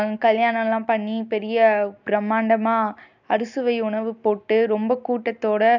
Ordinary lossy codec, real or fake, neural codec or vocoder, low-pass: none; real; none; 7.2 kHz